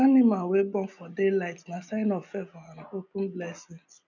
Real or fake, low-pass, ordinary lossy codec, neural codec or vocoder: real; 7.2 kHz; none; none